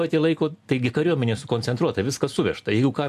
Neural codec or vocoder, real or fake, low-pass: none; real; 14.4 kHz